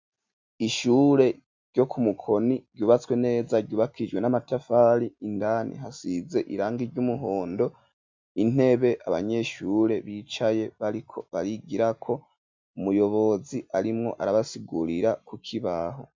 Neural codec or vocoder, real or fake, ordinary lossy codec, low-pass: none; real; MP3, 64 kbps; 7.2 kHz